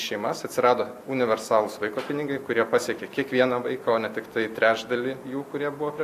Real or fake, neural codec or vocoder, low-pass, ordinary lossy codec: real; none; 14.4 kHz; AAC, 64 kbps